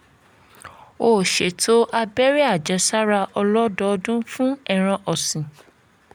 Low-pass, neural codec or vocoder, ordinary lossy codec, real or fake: none; none; none; real